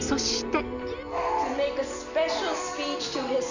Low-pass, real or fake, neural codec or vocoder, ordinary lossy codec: 7.2 kHz; real; none; Opus, 64 kbps